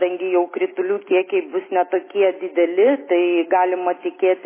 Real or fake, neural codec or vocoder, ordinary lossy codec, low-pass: real; none; MP3, 16 kbps; 3.6 kHz